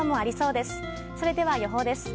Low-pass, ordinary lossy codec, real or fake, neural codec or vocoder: none; none; real; none